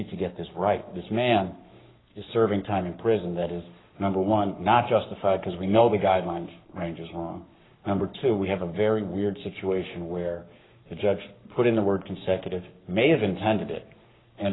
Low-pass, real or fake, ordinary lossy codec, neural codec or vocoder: 7.2 kHz; fake; AAC, 16 kbps; codec, 44.1 kHz, 7.8 kbps, Pupu-Codec